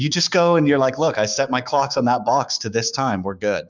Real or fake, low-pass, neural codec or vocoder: fake; 7.2 kHz; codec, 16 kHz, 6 kbps, DAC